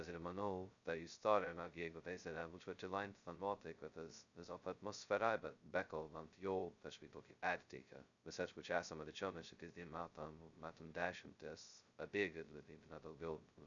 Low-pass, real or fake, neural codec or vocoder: 7.2 kHz; fake; codec, 16 kHz, 0.2 kbps, FocalCodec